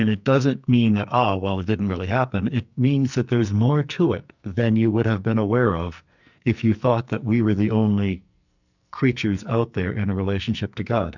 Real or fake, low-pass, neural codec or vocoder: fake; 7.2 kHz; codec, 44.1 kHz, 2.6 kbps, SNAC